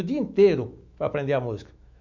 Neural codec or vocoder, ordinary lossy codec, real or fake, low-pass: autoencoder, 48 kHz, 128 numbers a frame, DAC-VAE, trained on Japanese speech; none; fake; 7.2 kHz